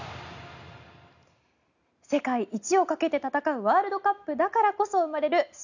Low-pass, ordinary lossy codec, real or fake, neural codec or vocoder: 7.2 kHz; none; real; none